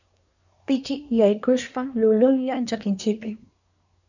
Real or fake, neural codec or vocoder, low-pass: fake; codec, 24 kHz, 1 kbps, SNAC; 7.2 kHz